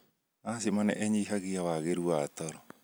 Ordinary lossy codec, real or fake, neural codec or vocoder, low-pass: none; real; none; none